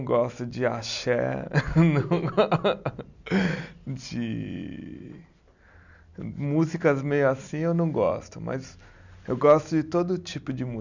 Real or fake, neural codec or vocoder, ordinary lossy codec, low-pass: real; none; none; 7.2 kHz